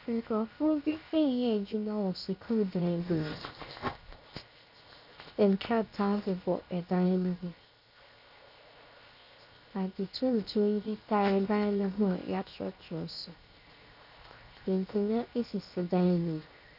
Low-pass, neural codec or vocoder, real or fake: 5.4 kHz; codec, 16 kHz, 0.7 kbps, FocalCodec; fake